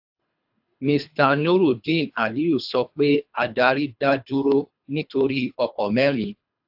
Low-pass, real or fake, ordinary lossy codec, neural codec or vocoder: 5.4 kHz; fake; AAC, 48 kbps; codec, 24 kHz, 3 kbps, HILCodec